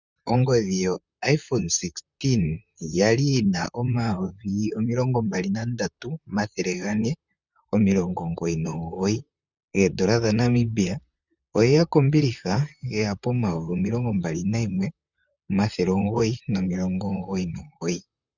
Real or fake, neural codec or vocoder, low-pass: fake; vocoder, 44.1 kHz, 128 mel bands, Pupu-Vocoder; 7.2 kHz